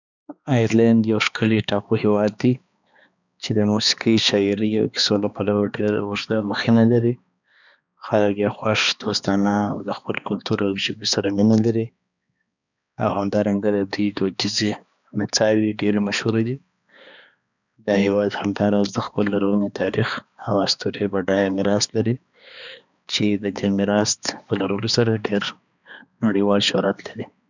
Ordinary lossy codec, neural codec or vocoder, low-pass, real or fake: none; codec, 16 kHz, 2 kbps, X-Codec, HuBERT features, trained on balanced general audio; 7.2 kHz; fake